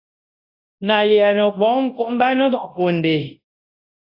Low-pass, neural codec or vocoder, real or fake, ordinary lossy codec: 5.4 kHz; codec, 24 kHz, 0.9 kbps, WavTokenizer, large speech release; fake; AAC, 32 kbps